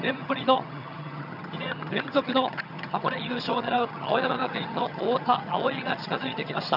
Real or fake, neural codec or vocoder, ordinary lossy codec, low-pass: fake; vocoder, 22.05 kHz, 80 mel bands, HiFi-GAN; none; 5.4 kHz